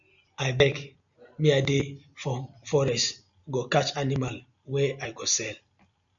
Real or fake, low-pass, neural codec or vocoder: real; 7.2 kHz; none